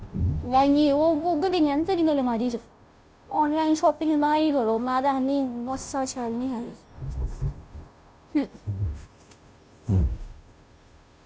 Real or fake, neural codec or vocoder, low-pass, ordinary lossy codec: fake; codec, 16 kHz, 0.5 kbps, FunCodec, trained on Chinese and English, 25 frames a second; none; none